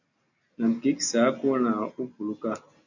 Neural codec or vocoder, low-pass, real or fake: none; 7.2 kHz; real